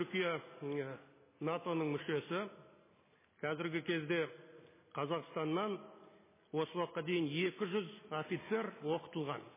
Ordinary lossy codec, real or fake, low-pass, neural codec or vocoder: MP3, 16 kbps; real; 3.6 kHz; none